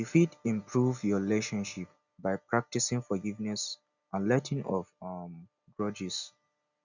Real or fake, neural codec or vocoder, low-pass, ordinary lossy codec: real; none; 7.2 kHz; none